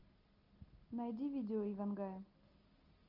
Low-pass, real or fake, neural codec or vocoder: 5.4 kHz; real; none